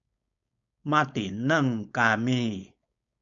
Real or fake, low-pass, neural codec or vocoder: fake; 7.2 kHz; codec, 16 kHz, 4.8 kbps, FACodec